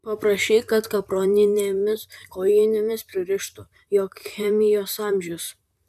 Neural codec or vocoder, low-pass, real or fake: vocoder, 44.1 kHz, 128 mel bands, Pupu-Vocoder; 14.4 kHz; fake